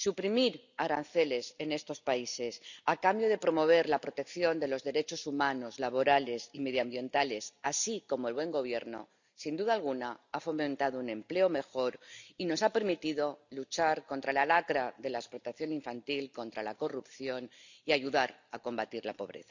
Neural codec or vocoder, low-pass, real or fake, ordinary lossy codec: none; 7.2 kHz; real; none